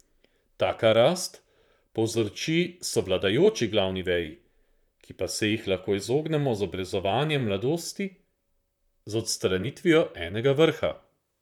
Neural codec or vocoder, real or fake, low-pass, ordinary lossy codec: vocoder, 44.1 kHz, 128 mel bands, Pupu-Vocoder; fake; 19.8 kHz; none